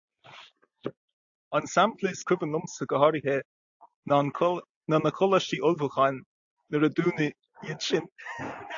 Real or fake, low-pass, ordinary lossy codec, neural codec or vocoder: real; 7.2 kHz; AAC, 64 kbps; none